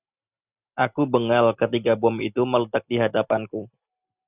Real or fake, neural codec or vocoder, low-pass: real; none; 3.6 kHz